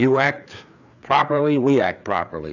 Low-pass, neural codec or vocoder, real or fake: 7.2 kHz; codec, 16 kHz, 4 kbps, FreqCodec, larger model; fake